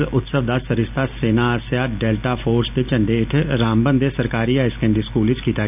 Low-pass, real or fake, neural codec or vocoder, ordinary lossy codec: 3.6 kHz; real; none; none